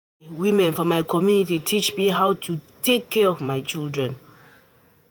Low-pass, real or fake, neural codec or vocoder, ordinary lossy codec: none; fake; vocoder, 48 kHz, 128 mel bands, Vocos; none